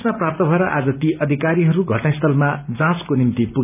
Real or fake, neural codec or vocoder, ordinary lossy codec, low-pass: real; none; none; 3.6 kHz